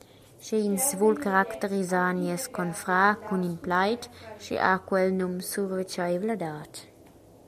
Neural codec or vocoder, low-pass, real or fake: none; 14.4 kHz; real